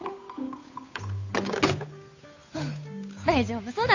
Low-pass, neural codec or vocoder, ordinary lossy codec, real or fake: 7.2 kHz; codec, 16 kHz, 8 kbps, FunCodec, trained on Chinese and English, 25 frames a second; AAC, 48 kbps; fake